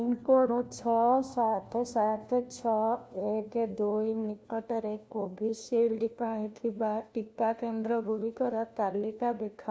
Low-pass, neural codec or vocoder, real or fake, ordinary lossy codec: none; codec, 16 kHz, 1 kbps, FunCodec, trained on LibriTTS, 50 frames a second; fake; none